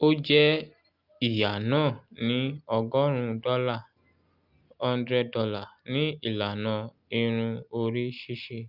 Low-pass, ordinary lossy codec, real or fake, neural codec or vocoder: 5.4 kHz; Opus, 24 kbps; real; none